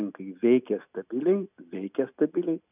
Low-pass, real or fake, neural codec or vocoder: 3.6 kHz; real; none